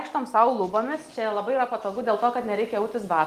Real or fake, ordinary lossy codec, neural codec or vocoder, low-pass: real; Opus, 24 kbps; none; 14.4 kHz